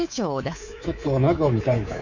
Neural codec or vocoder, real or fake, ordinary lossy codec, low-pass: codec, 24 kHz, 3.1 kbps, DualCodec; fake; none; 7.2 kHz